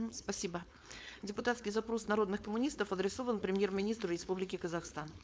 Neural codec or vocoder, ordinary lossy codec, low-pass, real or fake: codec, 16 kHz, 4.8 kbps, FACodec; none; none; fake